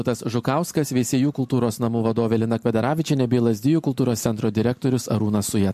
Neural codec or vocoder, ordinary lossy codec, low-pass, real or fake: none; MP3, 64 kbps; 14.4 kHz; real